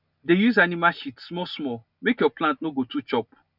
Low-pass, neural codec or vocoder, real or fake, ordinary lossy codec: 5.4 kHz; none; real; none